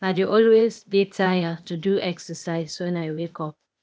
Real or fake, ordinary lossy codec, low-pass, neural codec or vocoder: fake; none; none; codec, 16 kHz, 0.8 kbps, ZipCodec